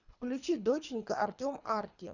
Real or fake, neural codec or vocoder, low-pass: fake; codec, 24 kHz, 3 kbps, HILCodec; 7.2 kHz